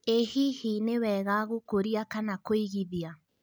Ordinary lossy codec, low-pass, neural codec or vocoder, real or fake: none; none; none; real